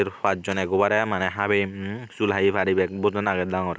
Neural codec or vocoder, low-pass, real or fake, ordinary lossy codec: none; none; real; none